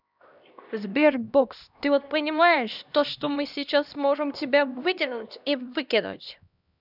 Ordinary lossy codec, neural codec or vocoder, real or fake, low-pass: none; codec, 16 kHz, 1 kbps, X-Codec, HuBERT features, trained on LibriSpeech; fake; 5.4 kHz